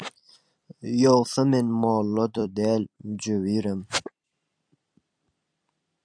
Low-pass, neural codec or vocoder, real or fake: 9.9 kHz; vocoder, 44.1 kHz, 128 mel bands every 256 samples, BigVGAN v2; fake